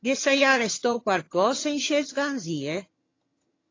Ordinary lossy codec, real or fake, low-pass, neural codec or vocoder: AAC, 32 kbps; fake; 7.2 kHz; vocoder, 22.05 kHz, 80 mel bands, HiFi-GAN